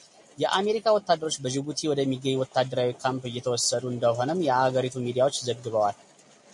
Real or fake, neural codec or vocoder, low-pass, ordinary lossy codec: real; none; 10.8 kHz; MP3, 48 kbps